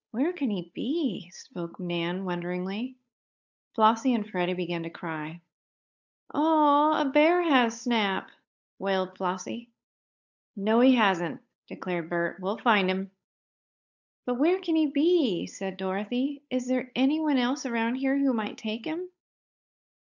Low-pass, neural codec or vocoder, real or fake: 7.2 kHz; codec, 16 kHz, 8 kbps, FunCodec, trained on Chinese and English, 25 frames a second; fake